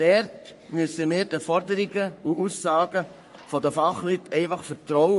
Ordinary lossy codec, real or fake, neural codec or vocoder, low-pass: MP3, 48 kbps; fake; codec, 44.1 kHz, 3.4 kbps, Pupu-Codec; 14.4 kHz